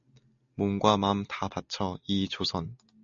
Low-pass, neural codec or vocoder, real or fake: 7.2 kHz; none; real